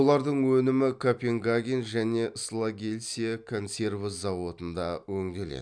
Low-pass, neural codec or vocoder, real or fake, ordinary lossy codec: 9.9 kHz; none; real; none